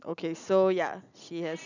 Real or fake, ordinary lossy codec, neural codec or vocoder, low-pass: real; none; none; 7.2 kHz